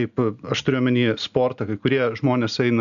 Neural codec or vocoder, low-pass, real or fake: none; 7.2 kHz; real